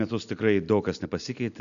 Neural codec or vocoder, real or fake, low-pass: none; real; 7.2 kHz